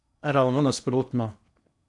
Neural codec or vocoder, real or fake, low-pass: codec, 16 kHz in and 24 kHz out, 0.8 kbps, FocalCodec, streaming, 65536 codes; fake; 10.8 kHz